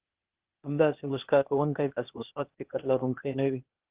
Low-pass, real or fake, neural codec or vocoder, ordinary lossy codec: 3.6 kHz; fake; codec, 16 kHz, 0.8 kbps, ZipCodec; Opus, 16 kbps